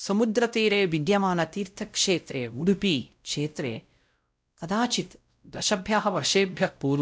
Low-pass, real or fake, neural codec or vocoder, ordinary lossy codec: none; fake; codec, 16 kHz, 0.5 kbps, X-Codec, WavLM features, trained on Multilingual LibriSpeech; none